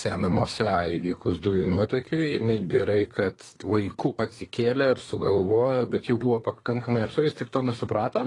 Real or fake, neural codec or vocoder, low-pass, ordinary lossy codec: fake; codec, 24 kHz, 1 kbps, SNAC; 10.8 kHz; AAC, 32 kbps